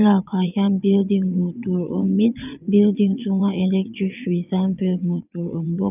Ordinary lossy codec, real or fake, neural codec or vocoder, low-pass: none; fake; vocoder, 22.05 kHz, 80 mel bands, WaveNeXt; 3.6 kHz